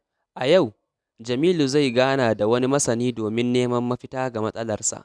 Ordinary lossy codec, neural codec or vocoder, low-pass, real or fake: none; none; none; real